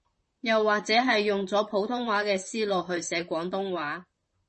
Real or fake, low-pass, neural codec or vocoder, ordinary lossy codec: fake; 10.8 kHz; vocoder, 44.1 kHz, 128 mel bands, Pupu-Vocoder; MP3, 32 kbps